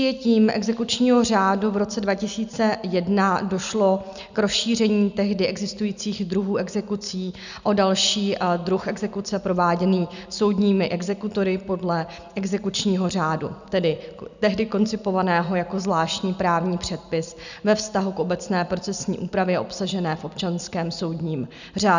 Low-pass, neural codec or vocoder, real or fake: 7.2 kHz; none; real